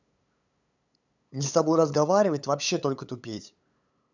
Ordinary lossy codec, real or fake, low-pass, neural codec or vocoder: none; fake; 7.2 kHz; codec, 16 kHz, 8 kbps, FunCodec, trained on LibriTTS, 25 frames a second